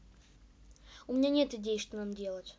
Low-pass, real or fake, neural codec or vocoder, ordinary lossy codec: none; real; none; none